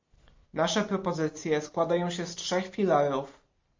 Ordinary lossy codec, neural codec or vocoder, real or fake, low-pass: MP3, 48 kbps; none; real; 7.2 kHz